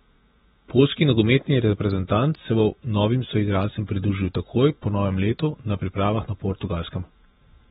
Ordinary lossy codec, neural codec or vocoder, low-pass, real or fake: AAC, 16 kbps; vocoder, 44.1 kHz, 128 mel bands every 512 samples, BigVGAN v2; 19.8 kHz; fake